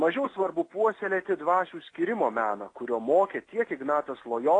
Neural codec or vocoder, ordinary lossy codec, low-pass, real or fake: none; AAC, 32 kbps; 9.9 kHz; real